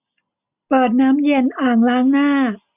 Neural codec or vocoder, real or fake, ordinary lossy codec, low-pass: none; real; none; 3.6 kHz